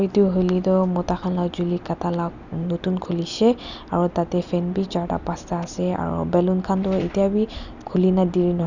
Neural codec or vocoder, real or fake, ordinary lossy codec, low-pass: none; real; none; 7.2 kHz